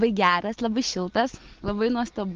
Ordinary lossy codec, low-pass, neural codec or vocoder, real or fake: Opus, 16 kbps; 7.2 kHz; none; real